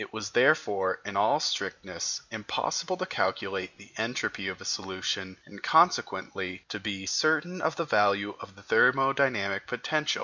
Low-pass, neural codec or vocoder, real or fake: 7.2 kHz; none; real